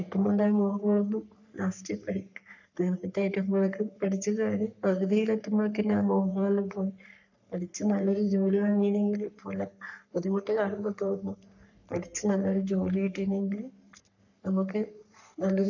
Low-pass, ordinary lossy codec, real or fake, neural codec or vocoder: 7.2 kHz; none; fake; codec, 44.1 kHz, 3.4 kbps, Pupu-Codec